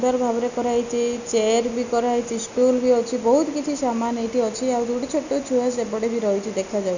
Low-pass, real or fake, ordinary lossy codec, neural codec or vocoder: 7.2 kHz; real; none; none